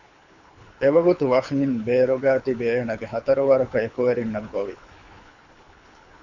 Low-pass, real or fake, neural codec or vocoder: 7.2 kHz; fake; codec, 24 kHz, 6 kbps, HILCodec